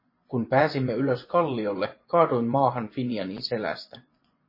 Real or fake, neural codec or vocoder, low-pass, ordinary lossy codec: fake; vocoder, 24 kHz, 100 mel bands, Vocos; 5.4 kHz; MP3, 24 kbps